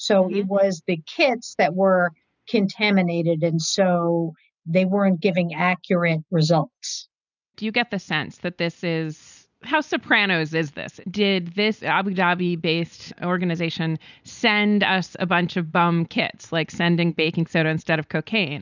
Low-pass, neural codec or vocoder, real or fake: 7.2 kHz; none; real